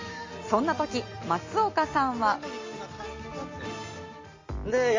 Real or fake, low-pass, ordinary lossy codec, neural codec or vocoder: real; 7.2 kHz; MP3, 32 kbps; none